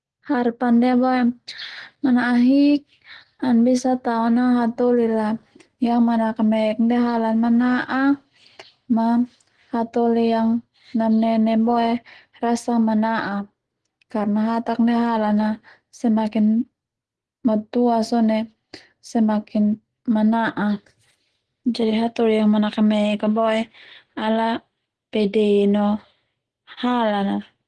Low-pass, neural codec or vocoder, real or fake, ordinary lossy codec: 10.8 kHz; none; real; Opus, 16 kbps